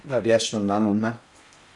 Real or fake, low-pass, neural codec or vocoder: fake; 10.8 kHz; codec, 16 kHz in and 24 kHz out, 0.6 kbps, FocalCodec, streaming, 4096 codes